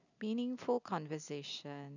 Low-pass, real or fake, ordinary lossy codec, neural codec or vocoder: 7.2 kHz; real; none; none